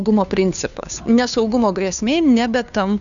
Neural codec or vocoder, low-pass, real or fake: codec, 16 kHz, 2 kbps, FunCodec, trained on Chinese and English, 25 frames a second; 7.2 kHz; fake